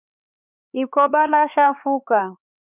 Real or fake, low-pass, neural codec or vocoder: fake; 3.6 kHz; codec, 16 kHz, 4 kbps, X-Codec, HuBERT features, trained on LibriSpeech